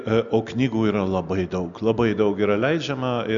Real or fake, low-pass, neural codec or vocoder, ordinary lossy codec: real; 7.2 kHz; none; MP3, 96 kbps